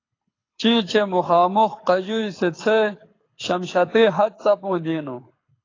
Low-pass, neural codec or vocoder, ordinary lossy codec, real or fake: 7.2 kHz; codec, 24 kHz, 6 kbps, HILCodec; AAC, 32 kbps; fake